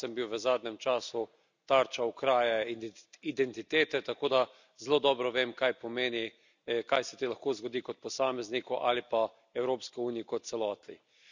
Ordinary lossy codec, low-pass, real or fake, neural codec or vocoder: none; 7.2 kHz; real; none